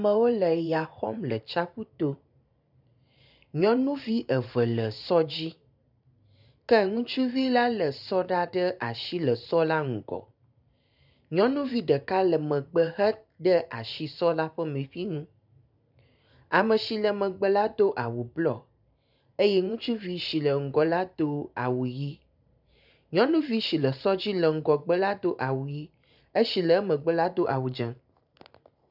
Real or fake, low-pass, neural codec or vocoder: fake; 5.4 kHz; vocoder, 44.1 kHz, 128 mel bands every 512 samples, BigVGAN v2